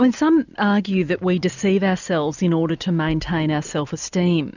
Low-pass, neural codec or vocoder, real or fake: 7.2 kHz; none; real